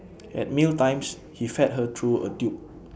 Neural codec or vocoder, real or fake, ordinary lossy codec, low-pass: none; real; none; none